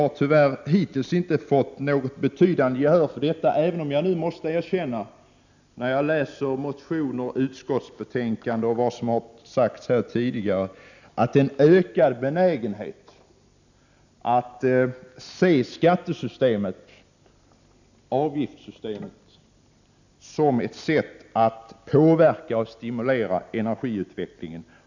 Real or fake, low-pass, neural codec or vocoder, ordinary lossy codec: real; 7.2 kHz; none; none